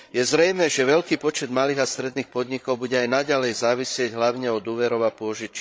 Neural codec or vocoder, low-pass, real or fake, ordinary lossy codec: codec, 16 kHz, 16 kbps, FreqCodec, larger model; none; fake; none